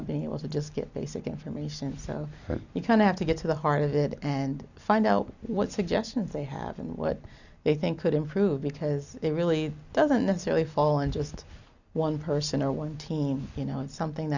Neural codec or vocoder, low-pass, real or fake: none; 7.2 kHz; real